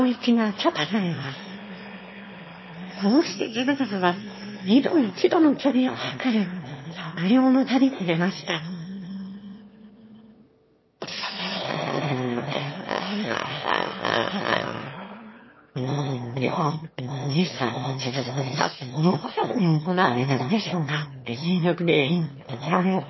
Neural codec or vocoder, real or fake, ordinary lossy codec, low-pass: autoencoder, 22.05 kHz, a latent of 192 numbers a frame, VITS, trained on one speaker; fake; MP3, 24 kbps; 7.2 kHz